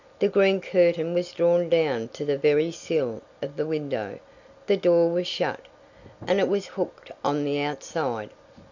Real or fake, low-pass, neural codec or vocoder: real; 7.2 kHz; none